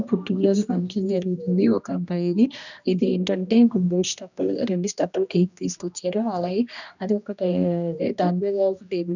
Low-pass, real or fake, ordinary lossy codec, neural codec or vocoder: 7.2 kHz; fake; none; codec, 16 kHz, 1 kbps, X-Codec, HuBERT features, trained on general audio